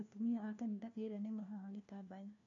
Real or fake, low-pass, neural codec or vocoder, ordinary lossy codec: fake; 7.2 kHz; codec, 16 kHz, 1 kbps, FunCodec, trained on LibriTTS, 50 frames a second; none